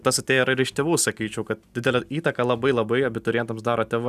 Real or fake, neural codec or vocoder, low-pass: real; none; 14.4 kHz